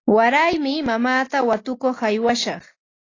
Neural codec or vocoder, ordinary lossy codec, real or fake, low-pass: none; AAC, 32 kbps; real; 7.2 kHz